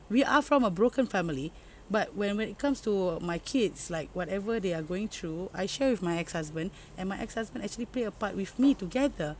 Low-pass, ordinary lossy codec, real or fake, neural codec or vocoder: none; none; real; none